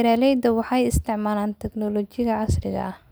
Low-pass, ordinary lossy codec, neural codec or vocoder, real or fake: none; none; none; real